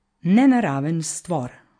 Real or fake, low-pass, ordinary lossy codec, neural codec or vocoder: real; 9.9 kHz; MP3, 48 kbps; none